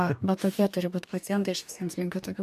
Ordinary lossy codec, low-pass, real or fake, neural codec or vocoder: MP3, 96 kbps; 14.4 kHz; fake; codec, 44.1 kHz, 2.6 kbps, DAC